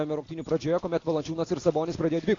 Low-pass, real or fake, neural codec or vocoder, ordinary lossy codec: 7.2 kHz; real; none; AAC, 32 kbps